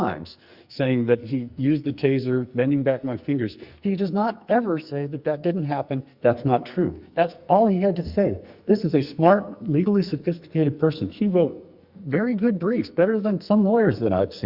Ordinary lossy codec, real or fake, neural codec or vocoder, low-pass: Opus, 64 kbps; fake; codec, 44.1 kHz, 2.6 kbps, SNAC; 5.4 kHz